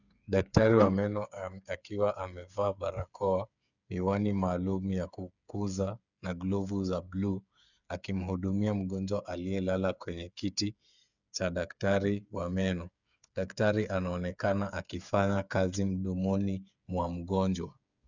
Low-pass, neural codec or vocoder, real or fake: 7.2 kHz; codec, 16 kHz, 8 kbps, FreqCodec, smaller model; fake